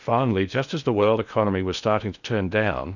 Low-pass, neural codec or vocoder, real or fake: 7.2 kHz; codec, 16 kHz in and 24 kHz out, 0.8 kbps, FocalCodec, streaming, 65536 codes; fake